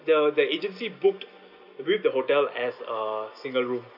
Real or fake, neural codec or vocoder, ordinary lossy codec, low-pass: real; none; none; 5.4 kHz